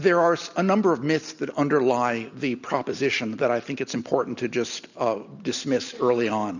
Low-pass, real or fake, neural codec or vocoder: 7.2 kHz; real; none